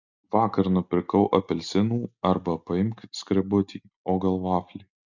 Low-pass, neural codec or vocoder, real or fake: 7.2 kHz; none; real